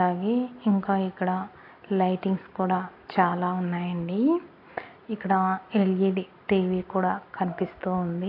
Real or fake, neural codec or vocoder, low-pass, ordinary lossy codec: real; none; 5.4 kHz; AAC, 32 kbps